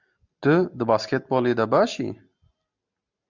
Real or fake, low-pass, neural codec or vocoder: real; 7.2 kHz; none